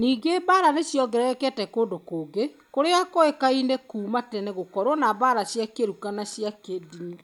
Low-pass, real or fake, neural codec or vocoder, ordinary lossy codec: 19.8 kHz; real; none; none